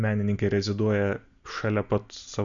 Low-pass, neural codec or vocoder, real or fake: 7.2 kHz; none; real